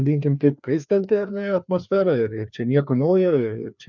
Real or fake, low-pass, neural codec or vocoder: fake; 7.2 kHz; codec, 16 kHz, 2 kbps, FreqCodec, larger model